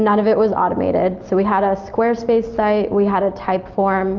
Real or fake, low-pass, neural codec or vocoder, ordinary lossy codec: real; 7.2 kHz; none; Opus, 24 kbps